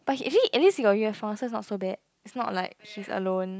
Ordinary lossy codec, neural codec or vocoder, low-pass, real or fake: none; none; none; real